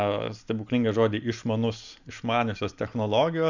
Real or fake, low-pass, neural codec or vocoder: real; 7.2 kHz; none